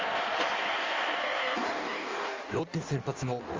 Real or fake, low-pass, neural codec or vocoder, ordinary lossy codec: fake; 7.2 kHz; codec, 16 kHz in and 24 kHz out, 1.1 kbps, FireRedTTS-2 codec; Opus, 32 kbps